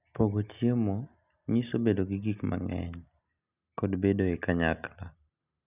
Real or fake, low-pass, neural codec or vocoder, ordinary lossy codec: real; 3.6 kHz; none; none